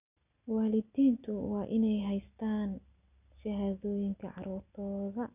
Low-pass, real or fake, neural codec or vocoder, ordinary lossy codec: 3.6 kHz; real; none; none